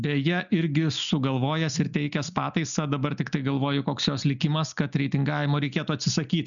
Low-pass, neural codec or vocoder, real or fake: 7.2 kHz; none; real